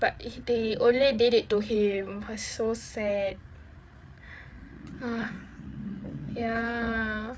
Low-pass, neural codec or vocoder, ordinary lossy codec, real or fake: none; codec, 16 kHz, 8 kbps, FreqCodec, larger model; none; fake